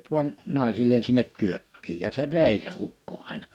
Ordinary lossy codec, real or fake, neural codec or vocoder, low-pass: none; fake; codec, 44.1 kHz, 2.6 kbps, DAC; 19.8 kHz